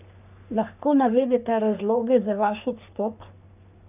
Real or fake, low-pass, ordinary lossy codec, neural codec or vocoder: fake; 3.6 kHz; none; codec, 44.1 kHz, 3.4 kbps, Pupu-Codec